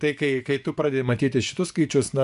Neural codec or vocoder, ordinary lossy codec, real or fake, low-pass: vocoder, 24 kHz, 100 mel bands, Vocos; AAC, 96 kbps; fake; 10.8 kHz